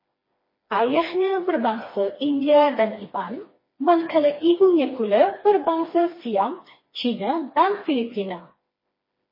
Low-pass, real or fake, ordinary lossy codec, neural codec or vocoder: 5.4 kHz; fake; MP3, 24 kbps; codec, 16 kHz, 2 kbps, FreqCodec, smaller model